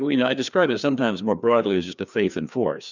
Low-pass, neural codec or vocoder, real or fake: 7.2 kHz; codec, 16 kHz, 2 kbps, FreqCodec, larger model; fake